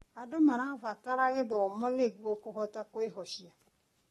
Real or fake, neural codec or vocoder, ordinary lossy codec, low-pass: fake; codec, 44.1 kHz, 7.8 kbps, Pupu-Codec; AAC, 32 kbps; 19.8 kHz